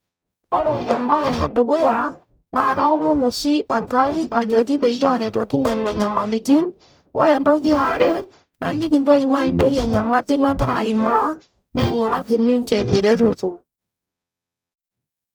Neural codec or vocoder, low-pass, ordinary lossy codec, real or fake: codec, 44.1 kHz, 0.9 kbps, DAC; none; none; fake